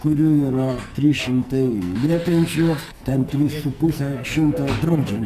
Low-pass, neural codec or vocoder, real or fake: 14.4 kHz; codec, 44.1 kHz, 2.6 kbps, SNAC; fake